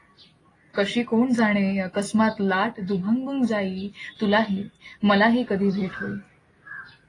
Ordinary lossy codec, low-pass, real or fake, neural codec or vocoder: AAC, 32 kbps; 10.8 kHz; real; none